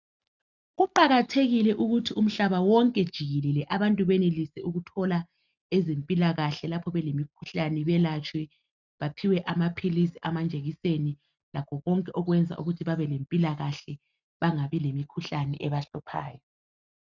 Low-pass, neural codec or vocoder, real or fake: 7.2 kHz; none; real